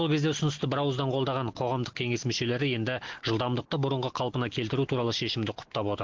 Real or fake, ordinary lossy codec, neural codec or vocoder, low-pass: real; Opus, 16 kbps; none; 7.2 kHz